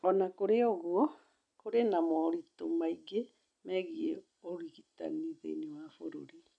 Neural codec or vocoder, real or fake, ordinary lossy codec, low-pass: none; real; none; 9.9 kHz